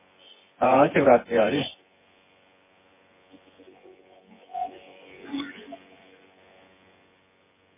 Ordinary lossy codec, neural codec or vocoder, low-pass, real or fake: MP3, 16 kbps; vocoder, 24 kHz, 100 mel bands, Vocos; 3.6 kHz; fake